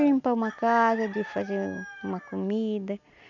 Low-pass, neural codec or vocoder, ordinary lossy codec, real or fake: 7.2 kHz; none; none; real